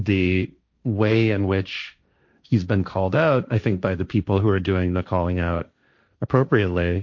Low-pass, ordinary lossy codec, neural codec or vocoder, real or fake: 7.2 kHz; MP3, 48 kbps; codec, 16 kHz, 1.1 kbps, Voila-Tokenizer; fake